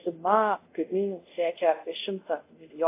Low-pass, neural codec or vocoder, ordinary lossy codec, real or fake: 3.6 kHz; codec, 24 kHz, 0.5 kbps, DualCodec; AAC, 24 kbps; fake